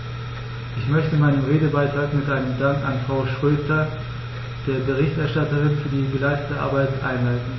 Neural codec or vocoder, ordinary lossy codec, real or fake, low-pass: none; MP3, 24 kbps; real; 7.2 kHz